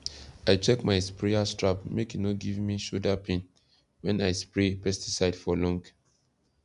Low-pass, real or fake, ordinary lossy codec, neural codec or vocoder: 10.8 kHz; real; none; none